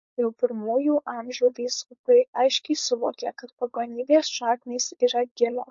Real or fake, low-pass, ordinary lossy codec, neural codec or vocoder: fake; 7.2 kHz; MP3, 48 kbps; codec, 16 kHz, 4.8 kbps, FACodec